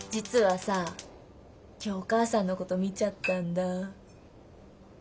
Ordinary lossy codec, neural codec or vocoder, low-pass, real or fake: none; none; none; real